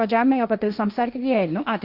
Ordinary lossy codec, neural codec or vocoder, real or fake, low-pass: Opus, 64 kbps; codec, 16 kHz, 1.1 kbps, Voila-Tokenizer; fake; 5.4 kHz